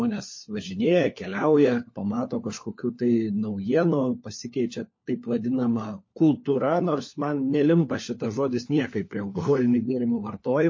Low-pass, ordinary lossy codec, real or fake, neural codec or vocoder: 7.2 kHz; MP3, 32 kbps; fake; codec, 16 kHz, 4 kbps, FunCodec, trained on LibriTTS, 50 frames a second